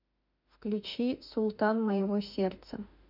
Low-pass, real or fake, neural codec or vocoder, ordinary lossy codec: 5.4 kHz; fake; autoencoder, 48 kHz, 32 numbers a frame, DAC-VAE, trained on Japanese speech; none